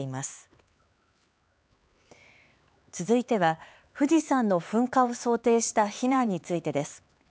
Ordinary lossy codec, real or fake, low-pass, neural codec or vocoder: none; fake; none; codec, 16 kHz, 4 kbps, X-Codec, HuBERT features, trained on LibriSpeech